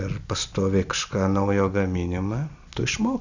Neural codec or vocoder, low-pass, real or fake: none; 7.2 kHz; real